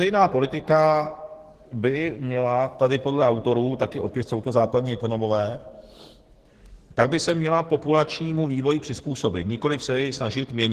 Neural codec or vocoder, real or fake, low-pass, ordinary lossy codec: codec, 44.1 kHz, 2.6 kbps, SNAC; fake; 14.4 kHz; Opus, 16 kbps